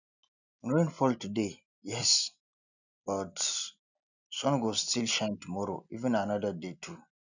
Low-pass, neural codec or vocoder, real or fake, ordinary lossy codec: 7.2 kHz; none; real; none